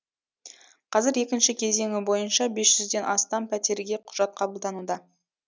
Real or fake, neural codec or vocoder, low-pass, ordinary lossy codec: real; none; none; none